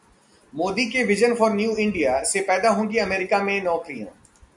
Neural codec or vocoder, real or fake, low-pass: none; real; 10.8 kHz